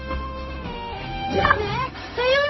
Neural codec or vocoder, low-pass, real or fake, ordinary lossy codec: codec, 16 kHz, 0.4 kbps, LongCat-Audio-Codec; 7.2 kHz; fake; MP3, 24 kbps